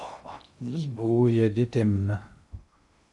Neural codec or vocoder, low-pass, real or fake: codec, 16 kHz in and 24 kHz out, 0.6 kbps, FocalCodec, streaming, 2048 codes; 10.8 kHz; fake